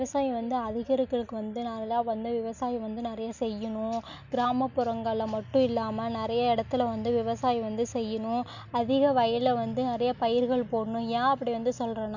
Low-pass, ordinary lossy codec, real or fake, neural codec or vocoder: 7.2 kHz; none; real; none